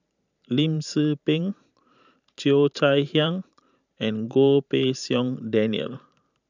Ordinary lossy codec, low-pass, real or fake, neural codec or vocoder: none; 7.2 kHz; fake; vocoder, 44.1 kHz, 128 mel bands every 512 samples, BigVGAN v2